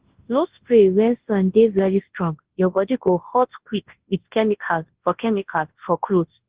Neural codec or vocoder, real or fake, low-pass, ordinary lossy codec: codec, 24 kHz, 0.9 kbps, WavTokenizer, large speech release; fake; 3.6 kHz; Opus, 16 kbps